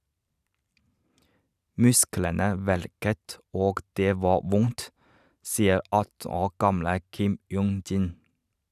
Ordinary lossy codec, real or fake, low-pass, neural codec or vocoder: none; fake; 14.4 kHz; vocoder, 44.1 kHz, 128 mel bands every 512 samples, BigVGAN v2